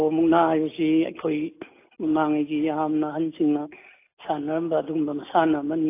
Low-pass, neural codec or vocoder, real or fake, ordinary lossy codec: 3.6 kHz; none; real; AAC, 24 kbps